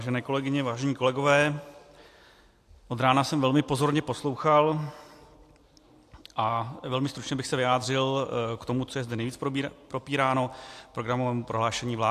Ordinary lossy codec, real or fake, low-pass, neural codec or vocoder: AAC, 64 kbps; real; 14.4 kHz; none